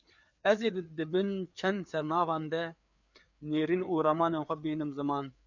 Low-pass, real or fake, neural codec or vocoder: 7.2 kHz; fake; codec, 16 kHz, 4 kbps, FreqCodec, larger model